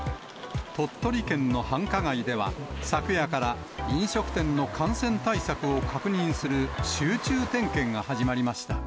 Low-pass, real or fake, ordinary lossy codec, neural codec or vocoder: none; real; none; none